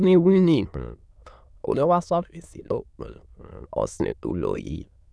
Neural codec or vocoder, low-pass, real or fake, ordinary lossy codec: autoencoder, 22.05 kHz, a latent of 192 numbers a frame, VITS, trained on many speakers; none; fake; none